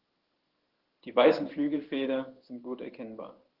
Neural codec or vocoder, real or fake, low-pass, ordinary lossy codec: codec, 16 kHz in and 24 kHz out, 1 kbps, XY-Tokenizer; fake; 5.4 kHz; Opus, 16 kbps